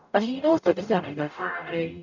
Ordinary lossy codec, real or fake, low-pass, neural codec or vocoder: none; fake; 7.2 kHz; codec, 44.1 kHz, 0.9 kbps, DAC